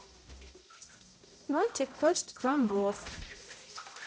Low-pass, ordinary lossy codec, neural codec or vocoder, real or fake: none; none; codec, 16 kHz, 0.5 kbps, X-Codec, HuBERT features, trained on balanced general audio; fake